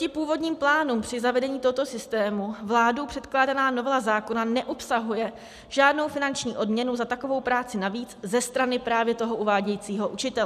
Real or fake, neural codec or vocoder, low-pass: real; none; 14.4 kHz